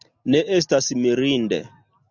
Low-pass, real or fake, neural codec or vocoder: 7.2 kHz; real; none